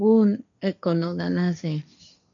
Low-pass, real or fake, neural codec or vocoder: 7.2 kHz; fake; codec, 16 kHz, 1.1 kbps, Voila-Tokenizer